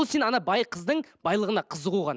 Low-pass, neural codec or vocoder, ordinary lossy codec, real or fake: none; none; none; real